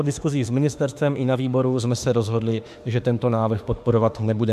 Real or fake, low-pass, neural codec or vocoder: fake; 14.4 kHz; autoencoder, 48 kHz, 32 numbers a frame, DAC-VAE, trained on Japanese speech